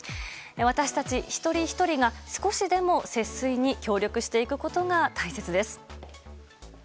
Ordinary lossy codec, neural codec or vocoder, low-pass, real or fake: none; none; none; real